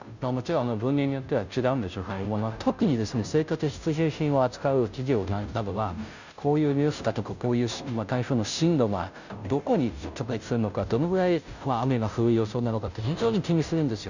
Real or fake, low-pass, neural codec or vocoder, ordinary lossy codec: fake; 7.2 kHz; codec, 16 kHz, 0.5 kbps, FunCodec, trained on Chinese and English, 25 frames a second; none